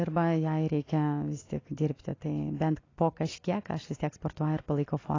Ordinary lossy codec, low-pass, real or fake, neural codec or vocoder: AAC, 32 kbps; 7.2 kHz; real; none